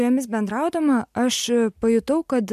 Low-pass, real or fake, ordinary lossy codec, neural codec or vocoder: 14.4 kHz; real; MP3, 96 kbps; none